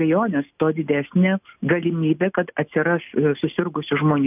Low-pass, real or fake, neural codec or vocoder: 3.6 kHz; real; none